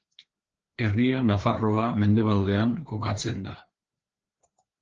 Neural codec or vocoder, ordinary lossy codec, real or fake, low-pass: codec, 16 kHz, 2 kbps, FreqCodec, larger model; Opus, 16 kbps; fake; 7.2 kHz